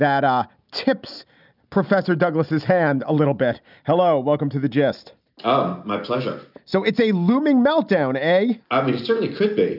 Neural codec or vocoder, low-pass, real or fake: none; 5.4 kHz; real